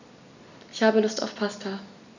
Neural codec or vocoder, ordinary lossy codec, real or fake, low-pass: none; none; real; 7.2 kHz